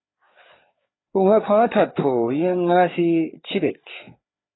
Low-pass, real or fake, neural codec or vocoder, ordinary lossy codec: 7.2 kHz; fake; codec, 16 kHz, 4 kbps, FreqCodec, larger model; AAC, 16 kbps